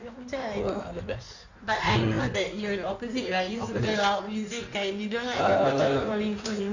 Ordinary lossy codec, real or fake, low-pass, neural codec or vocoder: none; fake; 7.2 kHz; codec, 16 kHz in and 24 kHz out, 1.1 kbps, FireRedTTS-2 codec